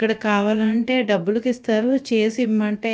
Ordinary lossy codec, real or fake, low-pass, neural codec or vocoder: none; fake; none; codec, 16 kHz, about 1 kbps, DyCAST, with the encoder's durations